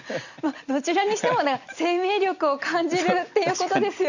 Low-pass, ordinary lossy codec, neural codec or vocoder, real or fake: 7.2 kHz; none; none; real